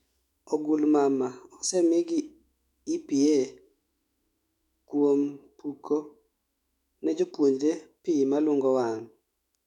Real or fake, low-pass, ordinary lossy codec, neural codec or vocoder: fake; 19.8 kHz; none; autoencoder, 48 kHz, 128 numbers a frame, DAC-VAE, trained on Japanese speech